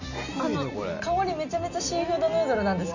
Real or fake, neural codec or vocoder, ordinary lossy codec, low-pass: real; none; Opus, 64 kbps; 7.2 kHz